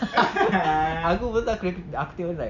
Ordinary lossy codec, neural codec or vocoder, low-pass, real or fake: none; none; 7.2 kHz; real